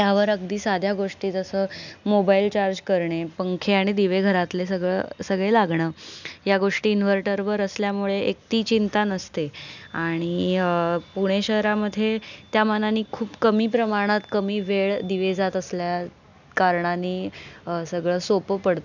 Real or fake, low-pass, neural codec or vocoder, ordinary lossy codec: real; 7.2 kHz; none; none